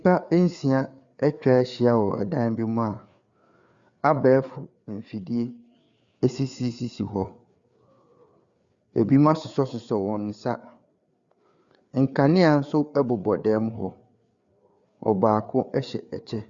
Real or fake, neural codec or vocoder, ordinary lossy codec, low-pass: fake; codec, 16 kHz, 4 kbps, FreqCodec, larger model; Opus, 64 kbps; 7.2 kHz